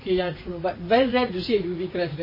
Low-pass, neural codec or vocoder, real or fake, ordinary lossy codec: 5.4 kHz; vocoder, 44.1 kHz, 80 mel bands, Vocos; fake; MP3, 24 kbps